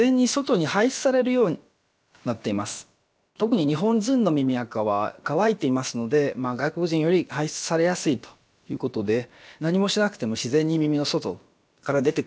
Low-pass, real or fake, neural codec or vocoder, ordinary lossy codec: none; fake; codec, 16 kHz, about 1 kbps, DyCAST, with the encoder's durations; none